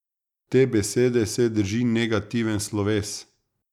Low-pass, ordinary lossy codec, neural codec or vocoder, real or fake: 19.8 kHz; none; none; real